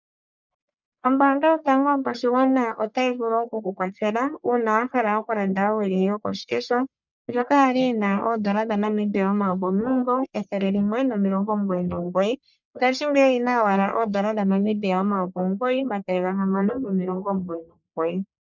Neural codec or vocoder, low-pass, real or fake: codec, 44.1 kHz, 1.7 kbps, Pupu-Codec; 7.2 kHz; fake